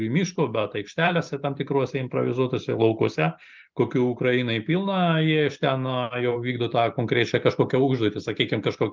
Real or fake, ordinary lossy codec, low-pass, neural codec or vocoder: real; Opus, 32 kbps; 7.2 kHz; none